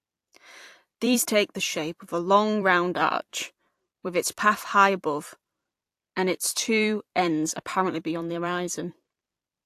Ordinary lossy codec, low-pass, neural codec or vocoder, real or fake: AAC, 64 kbps; 14.4 kHz; vocoder, 44.1 kHz, 128 mel bands every 256 samples, BigVGAN v2; fake